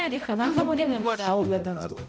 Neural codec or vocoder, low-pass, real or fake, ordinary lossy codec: codec, 16 kHz, 0.5 kbps, X-Codec, HuBERT features, trained on balanced general audio; none; fake; none